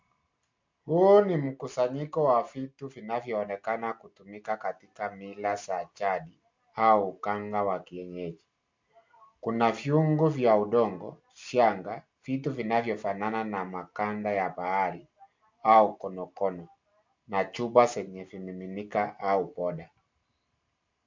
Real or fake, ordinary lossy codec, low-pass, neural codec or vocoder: real; MP3, 64 kbps; 7.2 kHz; none